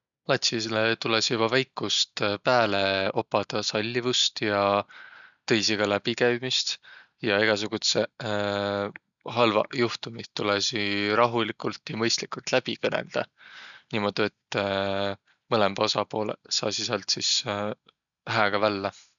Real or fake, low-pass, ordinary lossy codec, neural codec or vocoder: real; 7.2 kHz; none; none